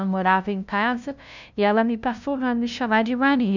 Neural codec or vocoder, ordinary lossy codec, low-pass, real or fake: codec, 16 kHz, 0.5 kbps, FunCodec, trained on LibriTTS, 25 frames a second; none; 7.2 kHz; fake